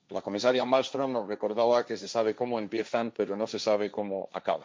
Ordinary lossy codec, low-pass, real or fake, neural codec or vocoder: none; none; fake; codec, 16 kHz, 1.1 kbps, Voila-Tokenizer